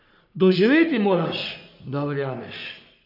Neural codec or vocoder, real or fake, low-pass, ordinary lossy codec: codec, 44.1 kHz, 3.4 kbps, Pupu-Codec; fake; 5.4 kHz; none